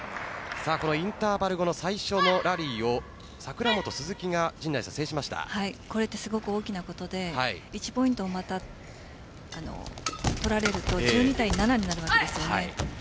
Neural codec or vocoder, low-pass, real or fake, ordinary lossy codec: none; none; real; none